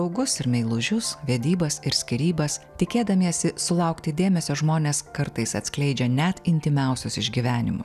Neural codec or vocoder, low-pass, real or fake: none; 14.4 kHz; real